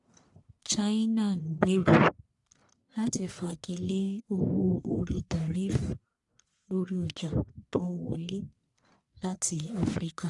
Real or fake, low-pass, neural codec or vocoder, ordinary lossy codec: fake; 10.8 kHz; codec, 44.1 kHz, 1.7 kbps, Pupu-Codec; none